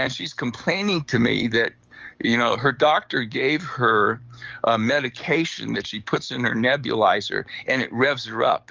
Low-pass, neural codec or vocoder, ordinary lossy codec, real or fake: 7.2 kHz; codec, 44.1 kHz, 7.8 kbps, DAC; Opus, 24 kbps; fake